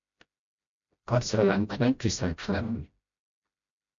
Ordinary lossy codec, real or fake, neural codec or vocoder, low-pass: MP3, 64 kbps; fake; codec, 16 kHz, 0.5 kbps, FreqCodec, smaller model; 7.2 kHz